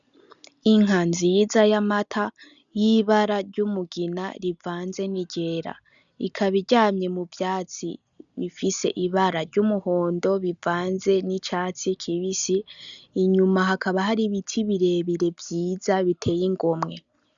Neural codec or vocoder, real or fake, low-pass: none; real; 7.2 kHz